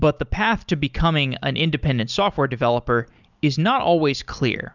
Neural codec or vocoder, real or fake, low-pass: none; real; 7.2 kHz